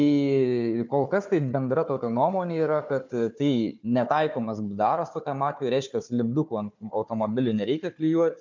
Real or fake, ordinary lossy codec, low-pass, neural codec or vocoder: fake; MP3, 64 kbps; 7.2 kHz; codec, 16 kHz, 4 kbps, FunCodec, trained on Chinese and English, 50 frames a second